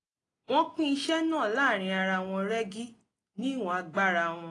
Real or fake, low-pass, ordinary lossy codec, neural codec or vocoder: real; 10.8 kHz; AAC, 32 kbps; none